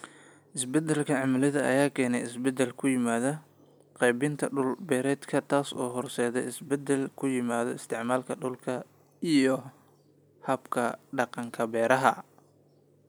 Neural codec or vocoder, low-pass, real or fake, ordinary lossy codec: none; none; real; none